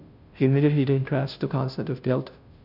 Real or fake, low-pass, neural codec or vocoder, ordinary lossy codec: fake; 5.4 kHz; codec, 16 kHz, 0.5 kbps, FunCodec, trained on Chinese and English, 25 frames a second; none